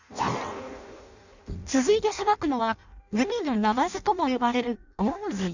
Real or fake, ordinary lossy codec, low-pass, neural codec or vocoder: fake; none; 7.2 kHz; codec, 16 kHz in and 24 kHz out, 0.6 kbps, FireRedTTS-2 codec